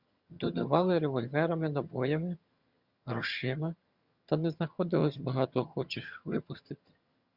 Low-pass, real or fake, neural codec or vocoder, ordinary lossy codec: 5.4 kHz; fake; vocoder, 22.05 kHz, 80 mel bands, HiFi-GAN; Opus, 64 kbps